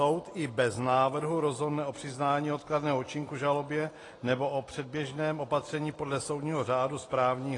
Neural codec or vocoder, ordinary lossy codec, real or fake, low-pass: none; AAC, 32 kbps; real; 10.8 kHz